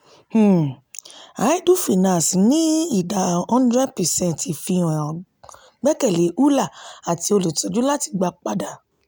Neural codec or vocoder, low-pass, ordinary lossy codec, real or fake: none; none; none; real